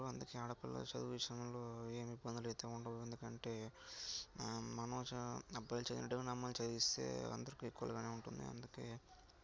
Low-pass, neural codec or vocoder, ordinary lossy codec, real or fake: none; none; none; real